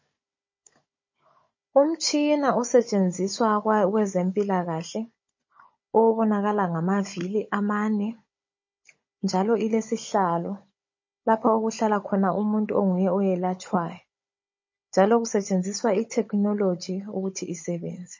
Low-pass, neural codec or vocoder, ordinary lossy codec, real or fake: 7.2 kHz; codec, 16 kHz, 16 kbps, FunCodec, trained on Chinese and English, 50 frames a second; MP3, 32 kbps; fake